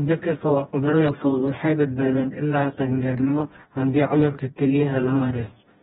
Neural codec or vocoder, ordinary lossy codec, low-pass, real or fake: codec, 16 kHz, 1 kbps, FreqCodec, smaller model; AAC, 16 kbps; 7.2 kHz; fake